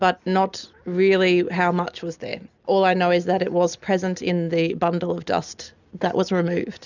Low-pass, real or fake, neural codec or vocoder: 7.2 kHz; real; none